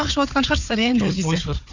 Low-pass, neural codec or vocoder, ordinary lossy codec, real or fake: 7.2 kHz; codec, 16 kHz, 4 kbps, FunCodec, trained on Chinese and English, 50 frames a second; none; fake